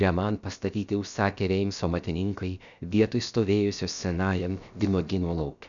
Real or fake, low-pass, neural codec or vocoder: fake; 7.2 kHz; codec, 16 kHz, about 1 kbps, DyCAST, with the encoder's durations